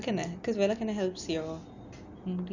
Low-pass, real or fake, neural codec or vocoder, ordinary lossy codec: 7.2 kHz; real; none; none